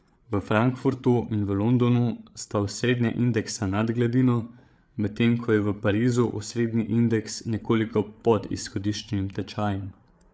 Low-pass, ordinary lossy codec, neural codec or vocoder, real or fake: none; none; codec, 16 kHz, 8 kbps, FreqCodec, larger model; fake